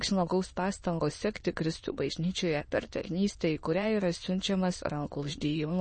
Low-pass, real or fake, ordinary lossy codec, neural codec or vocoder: 9.9 kHz; fake; MP3, 32 kbps; autoencoder, 22.05 kHz, a latent of 192 numbers a frame, VITS, trained on many speakers